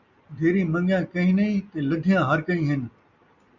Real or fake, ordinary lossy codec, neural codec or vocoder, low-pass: real; Opus, 64 kbps; none; 7.2 kHz